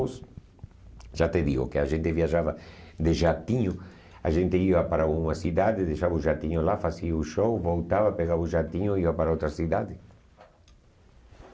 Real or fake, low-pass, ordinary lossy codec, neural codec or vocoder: real; none; none; none